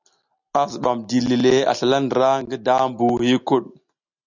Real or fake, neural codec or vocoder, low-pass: real; none; 7.2 kHz